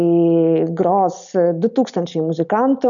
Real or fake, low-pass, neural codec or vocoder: real; 7.2 kHz; none